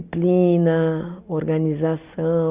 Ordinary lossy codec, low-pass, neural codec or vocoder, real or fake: Opus, 64 kbps; 3.6 kHz; none; real